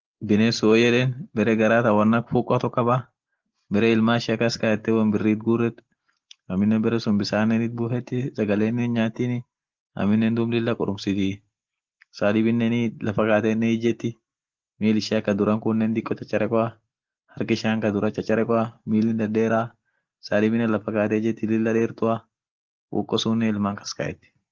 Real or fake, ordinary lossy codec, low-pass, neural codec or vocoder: real; Opus, 16 kbps; 7.2 kHz; none